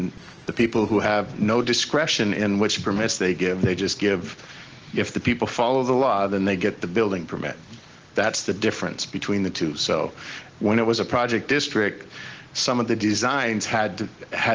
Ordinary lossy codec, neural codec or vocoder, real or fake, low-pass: Opus, 16 kbps; none; real; 7.2 kHz